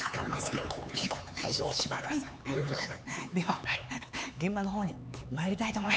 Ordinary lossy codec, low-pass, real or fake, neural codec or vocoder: none; none; fake; codec, 16 kHz, 4 kbps, X-Codec, HuBERT features, trained on LibriSpeech